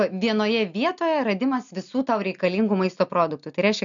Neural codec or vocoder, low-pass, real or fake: none; 7.2 kHz; real